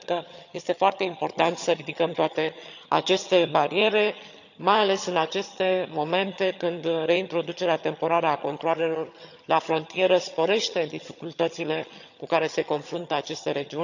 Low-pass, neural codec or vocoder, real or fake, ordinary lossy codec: 7.2 kHz; vocoder, 22.05 kHz, 80 mel bands, HiFi-GAN; fake; none